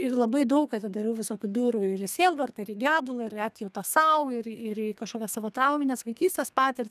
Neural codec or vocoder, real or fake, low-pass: codec, 32 kHz, 1.9 kbps, SNAC; fake; 14.4 kHz